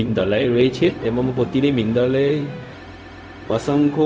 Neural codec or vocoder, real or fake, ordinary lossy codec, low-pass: codec, 16 kHz, 0.4 kbps, LongCat-Audio-Codec; fake; none; none